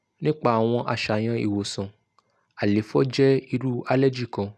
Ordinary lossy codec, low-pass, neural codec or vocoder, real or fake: none; none; none; real